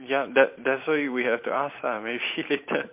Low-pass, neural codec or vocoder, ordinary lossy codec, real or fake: 3.6 kHz; vocoder, 44.1 kHz, 128 mel bands every 512 samples, BigVGAN v2; MP3, 32 kbps; fake